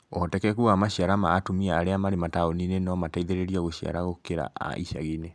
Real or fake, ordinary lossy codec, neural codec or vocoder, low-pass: real; none; none; none